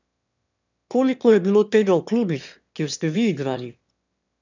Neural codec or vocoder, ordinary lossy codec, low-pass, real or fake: autoencoder, 22.05 kHz, a latent of 192 numbers a frame, VITS, trained on one speaker; none; 7.2 kHz; fake